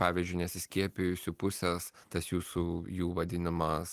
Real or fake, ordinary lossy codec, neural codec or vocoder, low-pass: real; Opus, 24 kbps; none; 14.4 kHz